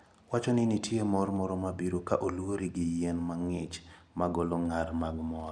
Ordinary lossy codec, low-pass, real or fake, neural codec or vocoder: none; 9.9 kHz; real; none